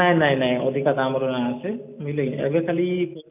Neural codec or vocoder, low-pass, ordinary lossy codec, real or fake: none; 3.6 kHz; none; real